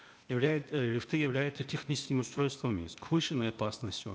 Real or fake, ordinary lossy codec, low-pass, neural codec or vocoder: fake; none; none; codec, 16 kHz, 0.8 kbps, ZipCodec